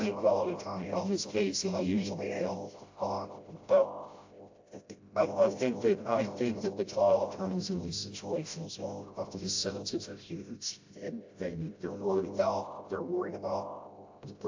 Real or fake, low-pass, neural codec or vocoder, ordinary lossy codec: fake; 7.2 kHz; codec, 16 kHz, 0.5 kbps, FreqCodec, smaller model; AAC, 48 kbps